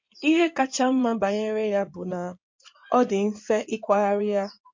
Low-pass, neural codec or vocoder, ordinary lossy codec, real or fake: 7.2 kHz; codec, 16 kHz in and 24 kHz out, 2.2 kbps, FireRedTTS-2 codec; MP3, 48 kbps; fake